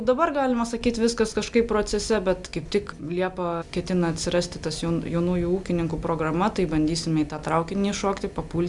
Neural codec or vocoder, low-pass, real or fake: none; 10.8 kHz; real